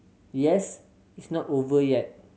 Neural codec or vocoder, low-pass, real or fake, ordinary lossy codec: none; none; real; none